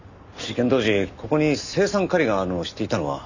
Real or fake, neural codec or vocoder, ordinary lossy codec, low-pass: real; none; none; 7.2 kHz